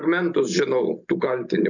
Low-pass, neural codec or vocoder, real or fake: 7.2 kHz; none; real